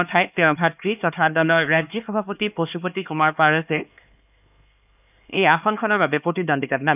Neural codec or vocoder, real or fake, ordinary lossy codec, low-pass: codec, 16 kHz, 2 kbps, X-Codec, HuBERT features, trained on LibriSpeech; fake; none; 3.6 kHz